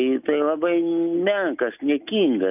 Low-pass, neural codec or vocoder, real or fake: 3.6 kHz; none; real